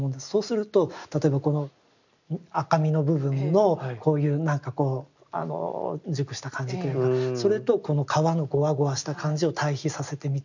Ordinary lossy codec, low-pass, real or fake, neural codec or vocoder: none; 7.2 kHz; real; none